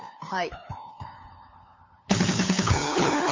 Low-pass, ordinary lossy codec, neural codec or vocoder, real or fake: 7.2 kHz; MP3, 32 kbps; codec, 16 kHz, 8 kbps, FunCodec, trained on LibriTTS, 25 frames a second; fake